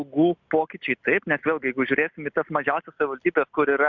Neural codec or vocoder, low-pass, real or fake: none; 7.2 kHz; real